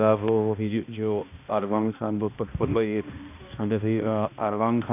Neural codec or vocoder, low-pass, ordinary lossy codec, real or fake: codec, 16 kHz, 1 kbps, X-Codec, HuBERT features, trained on balanced general audio; 3.6 kHz; none; fake